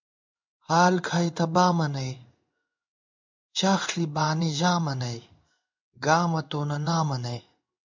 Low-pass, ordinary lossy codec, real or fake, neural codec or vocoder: 7.2 kHz; MP3, 64 kbps; fake; codec, 16 kHz in and 24 kHz out, 1 kbps, XY-Tokenizer